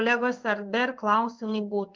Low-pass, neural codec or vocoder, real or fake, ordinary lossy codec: 7.2 kHz; codec, 16 kHz in and 24 kHz out, 1 kbps, XY-Tokenizer; fake; Opus, 32 kbps